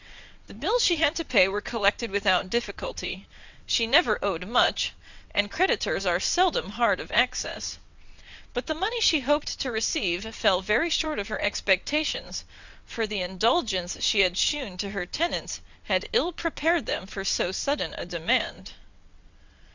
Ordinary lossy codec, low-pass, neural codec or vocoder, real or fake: Opus, 64 kbps; 7.2 kHz; vocoder, 22.05 kHz, 80 mel bands, WaveNeXt; fake